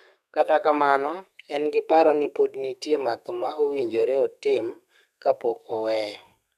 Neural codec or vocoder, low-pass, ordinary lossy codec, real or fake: codec, 32 kHz, 1.9 kbps, SNAC; 14.4 kHz; none; fake